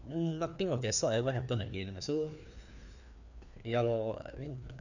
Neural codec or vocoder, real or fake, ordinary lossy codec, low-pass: codec, 16 kHz, 2 kbps, FreqCodec, larger model; fake; none; 7.2 kHz